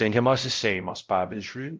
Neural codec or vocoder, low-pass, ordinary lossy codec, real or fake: codec, 16 kHz, 0.5 kbps, X-Codec, WavLM features, trained on Multilingual LibriSpeech; 7.2 kHz; Opus, 32 kbps; fake